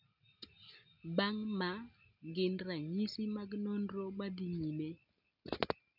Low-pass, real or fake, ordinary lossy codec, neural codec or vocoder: 5.4 kHz; real; none; none